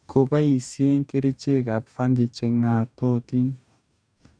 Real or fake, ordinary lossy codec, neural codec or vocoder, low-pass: fake; none; codec, 44.1 kHz, 2.6 kbps, DAC; 9.9 kHz